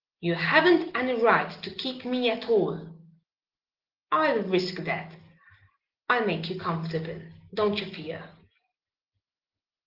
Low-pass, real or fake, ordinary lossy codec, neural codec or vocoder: 5.4 kHz; real; Opus, 16 kbps; none